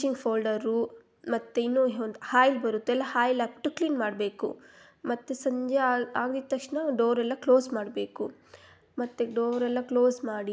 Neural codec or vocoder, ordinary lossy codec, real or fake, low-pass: none; none; real; none